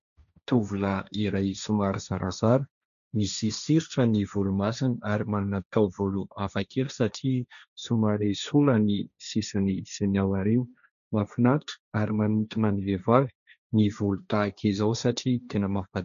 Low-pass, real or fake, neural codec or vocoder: 7.2 kHz; fake; codec, 16 kHz, 1.1 kbps, Voila-Tokenizer